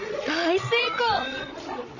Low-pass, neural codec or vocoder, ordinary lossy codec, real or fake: 7.2 kHz; codec, 16 kHz, 16 kbps, FreqCodec, larger model; none; fake